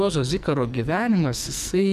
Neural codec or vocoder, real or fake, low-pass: codec, 44.1 kHz, 2.6 kbps, SNAC; fake; 14.4 kHz